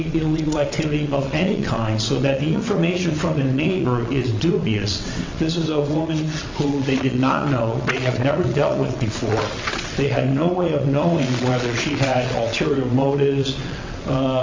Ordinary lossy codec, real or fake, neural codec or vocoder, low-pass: MP3, 48 kbps; fake; vocoder, 22.05 kHz, 80 mel bands, WaveNeXt; 7.2 kHz